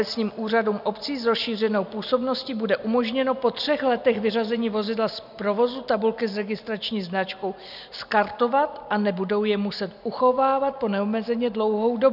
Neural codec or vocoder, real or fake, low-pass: none; real; 5.4 kHz